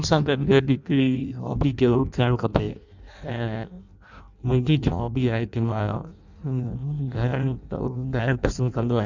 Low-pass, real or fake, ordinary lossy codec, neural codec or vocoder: 7.2 kHz; fake; none; codec, 16 kHz in and 24 kHz out, 0.6 kbps, FireRedTTS-2 codec